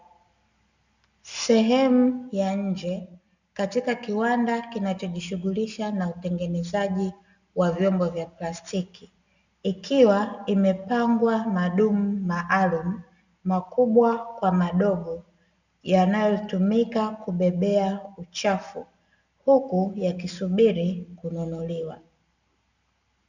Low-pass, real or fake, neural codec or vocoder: 7.2 kHz; real; none